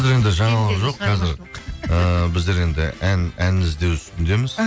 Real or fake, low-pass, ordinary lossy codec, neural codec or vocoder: real; none; none; none